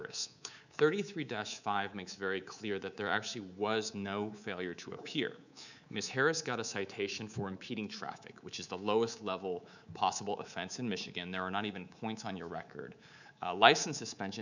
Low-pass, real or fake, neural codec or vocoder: 7.2 kHz; fake; codec, 24 kHz, 3.1 kbps, DualCodec